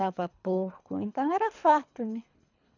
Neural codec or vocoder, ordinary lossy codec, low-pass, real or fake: codec, 24 kHz, 3 kbps, HILCodec; none; 7.2 kHz; fake